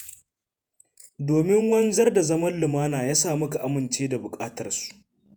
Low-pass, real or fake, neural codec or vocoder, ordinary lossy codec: none; fake; vocoder, 48 kHz, 128 mel bands, Vocos; none